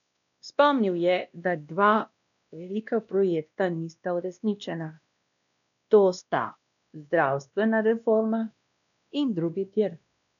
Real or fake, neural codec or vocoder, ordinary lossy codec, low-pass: fake; codec, 16 kHz, 1 kbps, X-Codec, WavLM features, trained on Multilingual LibriSpeech; none; 7.2 kHz